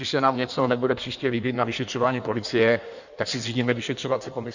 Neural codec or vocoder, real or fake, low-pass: codec, 16 kHz in and 24 kHz out, 1.1 kbps, FireRedTTS-2 codec; fake; 7.2 kHz